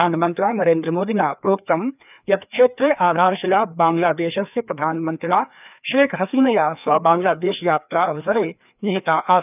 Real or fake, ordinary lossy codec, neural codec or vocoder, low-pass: fake; none; codec, 16 kHz, 2 kbps, FreqCodec, larger model; 3.6 kHz